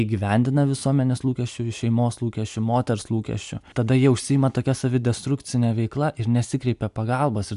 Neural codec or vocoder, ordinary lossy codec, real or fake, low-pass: none; AAC, 96 kbps; real; 10.8 kHz